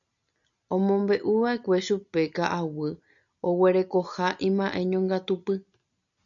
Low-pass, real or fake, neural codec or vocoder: 7.2 kHz; real; none